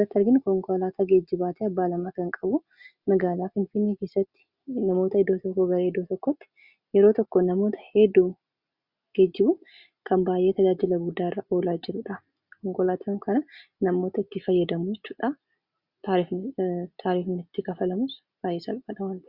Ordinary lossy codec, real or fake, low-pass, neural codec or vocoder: Opus, 64 kbps; real; 5.4 kHz; none